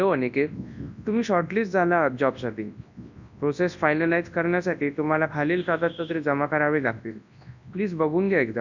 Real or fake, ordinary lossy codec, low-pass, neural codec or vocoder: fake; none; 7.2 kHz; codec, 24 kHz, 0.9 kbps, WavTokenizer, large speech release